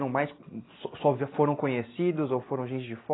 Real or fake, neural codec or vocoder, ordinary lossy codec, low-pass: real; none; AAC, 16 kbps; 7.2 kHz